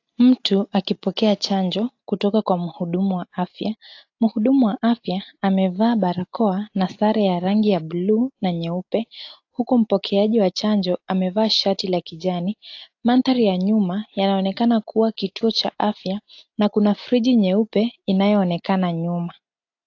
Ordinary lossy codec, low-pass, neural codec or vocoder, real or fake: AAC, 48 kbps; 7.2 kHz; none; real